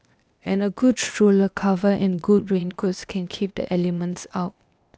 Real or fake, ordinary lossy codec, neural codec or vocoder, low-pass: fake; none; codec, 16 kHz, 0.8 kbps, ZipCodec; none